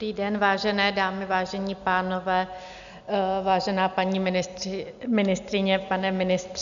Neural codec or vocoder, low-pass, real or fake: none; 7.2 kHz; real